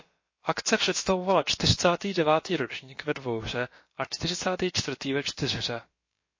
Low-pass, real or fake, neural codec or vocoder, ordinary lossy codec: 7.2 kHz; fake; codec, 16 kHz, about 1 kbps, DyCAST, with the encoder's durations; MP3, 32 kbps